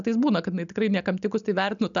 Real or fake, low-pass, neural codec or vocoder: real; 7.2 kHz; none